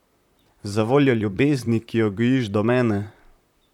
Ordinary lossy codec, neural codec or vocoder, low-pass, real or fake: none; vocoder, 44.1 kHz, 128 mel bands, Pupu-Vocoder; 19.8 kHz; fake